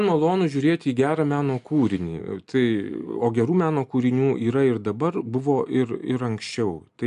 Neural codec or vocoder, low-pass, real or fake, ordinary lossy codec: none; 10.8 kHz; real; AAC, 96 kbps